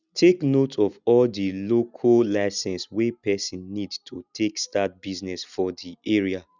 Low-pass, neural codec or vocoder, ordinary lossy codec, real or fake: 7.2 kHz; none; none; real